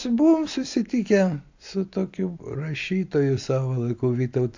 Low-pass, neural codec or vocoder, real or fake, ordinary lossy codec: 7.2 kHz; none; real; AAC, 48 kbps